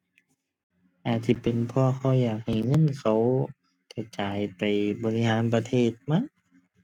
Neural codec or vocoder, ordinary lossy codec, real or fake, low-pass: codec, 44.1 kHz, 7.8 kbps, Pupu-Codec; none; fake; 19.8 kHz